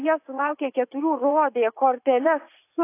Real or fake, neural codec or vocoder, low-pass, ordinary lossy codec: fake; vocoder, 44.1 kHz, 80 mel bands, Vocos; 3.6 kHz; AAC, 24 kbps